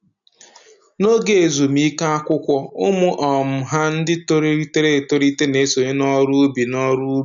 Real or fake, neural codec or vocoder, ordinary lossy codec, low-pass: real; none; none; 7.2 kHz